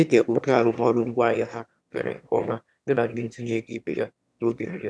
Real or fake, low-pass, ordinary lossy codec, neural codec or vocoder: fake; none; none; autoencoder, 22.05 kHz, a latent of 192 numbers a frame, VITS, trained on one speaker